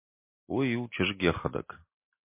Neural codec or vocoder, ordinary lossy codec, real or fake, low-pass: none; MP3, 24 kbps; real; 3.6 kHz